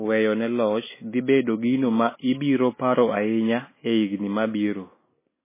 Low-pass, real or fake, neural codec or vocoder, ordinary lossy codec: 3.6 kHz; real; none; MP3, 16 kbps